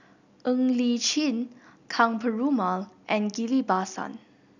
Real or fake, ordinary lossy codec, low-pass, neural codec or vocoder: real; none; 7.2 kHz; none